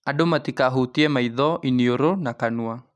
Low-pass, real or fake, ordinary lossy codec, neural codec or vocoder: none; real; none; none